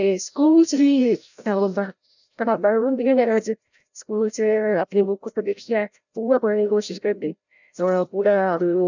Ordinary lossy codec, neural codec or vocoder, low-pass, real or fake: none; codec, 16 kHz, 0.5 kbps, FreqCodec, larger model; 7.2 kHz; fake